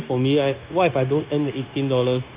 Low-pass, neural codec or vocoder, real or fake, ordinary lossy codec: 3.6 kHz; codec, 16 kHz, 0.9 kbps, LongCat-Audio-Codec; fake; Opus, 32 kbps